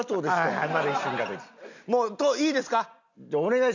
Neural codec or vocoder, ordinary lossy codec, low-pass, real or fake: none; none; 7.2 kHz; real